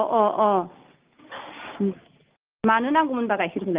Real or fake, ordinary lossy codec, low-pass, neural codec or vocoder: real; Opus, 32 kbps; 3.6 kHz; none